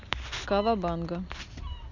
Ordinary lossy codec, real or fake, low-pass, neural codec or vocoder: none; real; 7.2 kHz; none